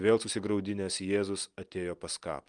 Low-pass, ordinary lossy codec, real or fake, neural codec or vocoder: 9.9 kHz; Opus, 32 kbps; real; none